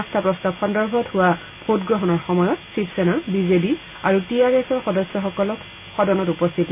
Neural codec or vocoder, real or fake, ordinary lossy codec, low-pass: none; real; none; 3.6 kHz